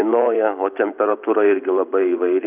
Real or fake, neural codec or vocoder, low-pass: fake; vocoder, 44.1 kHz, 128 mel bands every 512 samples, BigVGAN v2; 3.6 kHz